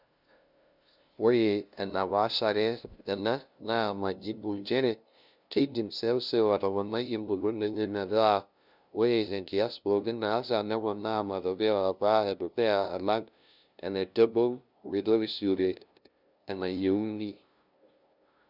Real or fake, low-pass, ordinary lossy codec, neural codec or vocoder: fake; 5.4 kHz; none; codec, 16 kHz, 0.5 kbps, FunCodec, trained on LibriTTS, 25 frames a second